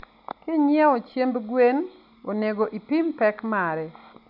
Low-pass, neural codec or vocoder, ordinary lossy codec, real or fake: 5.4 kHz; none; none; real